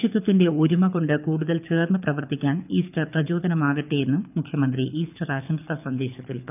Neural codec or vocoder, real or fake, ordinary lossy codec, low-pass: codec, 24 kHz, 6 kbps, HILCodec; fake; none; 3.6 kHz